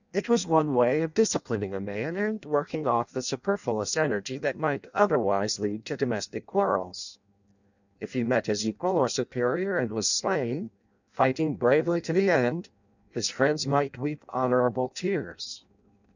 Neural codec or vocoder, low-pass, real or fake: codec, 16 kHz in and 24 kHz out, 0.6 kbps, FireRedTTS-2 codec; 7.2 kHz; fake